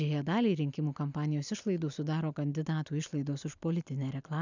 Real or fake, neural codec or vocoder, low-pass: real; none; 7.2 kHz